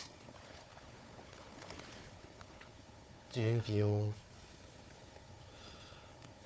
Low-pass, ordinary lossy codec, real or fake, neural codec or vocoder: none; none; fake; codec, 16 kHz, 4 kbps, FunCodec, trained on Chinese and English, 50 frames a second